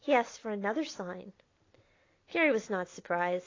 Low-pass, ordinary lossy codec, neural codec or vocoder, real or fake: 7.2 kHz; AAC, 32 kbps; none; real